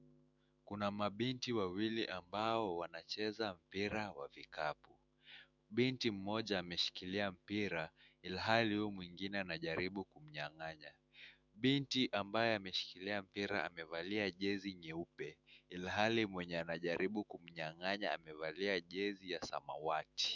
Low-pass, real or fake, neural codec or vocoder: 7.2 kHz; fake; autoencoder, 48 kHz, 128 numbers a frame, DAC-VAE, trained on Japanese speech